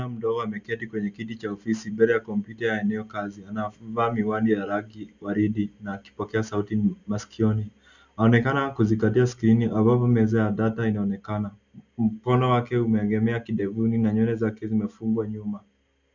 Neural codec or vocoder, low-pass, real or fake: none; 7.2 kHz; real